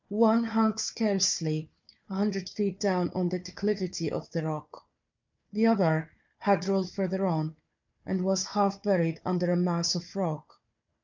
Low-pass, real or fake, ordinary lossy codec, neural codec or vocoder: 7.2 kHz; fake; MP3, 64 kbps; codec, 16 kHz, 4 kbps, FunCodec, trained on Chinese and English, 50 frames a second